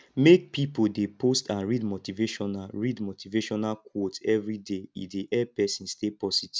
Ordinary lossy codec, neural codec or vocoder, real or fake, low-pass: none; none; real; none